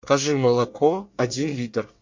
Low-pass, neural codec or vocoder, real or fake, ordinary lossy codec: 7.2 kHz; codec, 24 kHz, 1 kbps, SNAC; fake; MP3, 48 kbps